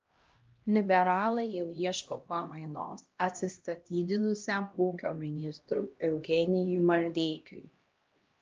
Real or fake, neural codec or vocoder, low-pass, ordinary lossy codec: fake; codec, 16 kHz, 1 kbps, X-Codec, HuBERT features, trained on LibriSpeech; 7.2 kHz; Opus, 24 kbps